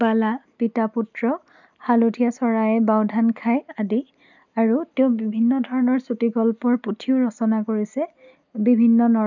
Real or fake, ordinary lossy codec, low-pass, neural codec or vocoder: real; none; 7.2 kHz; none